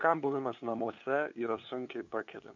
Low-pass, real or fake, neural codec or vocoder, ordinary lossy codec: 7.2 kHz; fake; codec, 16 kHz, 2 kbps, FunCodec, trained on LibriTTS, 25 frames a second; MP3, 64 kbps